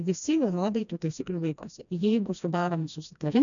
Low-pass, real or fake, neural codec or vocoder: 7.2 kHz; fake; codec, 16 kHz, 1 kbps, FreqCodec, smaller model